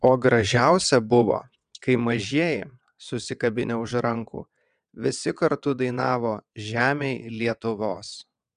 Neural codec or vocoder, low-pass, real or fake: vocoder, 22.05 kHz, 80 mel bands, WaveNeXt; 9.9 kHz; fake